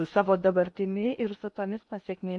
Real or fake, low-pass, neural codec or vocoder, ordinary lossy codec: fake; 10.8 kHz; codec, 16 kHz in and 24 kHz out, 0.8 kbps, FocalCodec, streaming, 65536 codes; MP3, 64 kbps